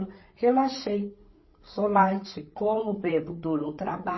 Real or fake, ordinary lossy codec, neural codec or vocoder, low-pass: fake; MP3, 24 kbps; codec, 16 kHz, 8 kbps, FreqCodec, larger model; 7.2 kHz